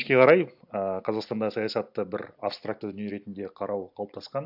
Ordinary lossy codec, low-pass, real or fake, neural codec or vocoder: AAC, 48 kbps; 5.4 kHz; real; none